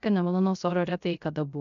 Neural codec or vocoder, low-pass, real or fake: codec, 16 kHz, 0.3 kbps, FocalCodec; 7.2 kHz; fake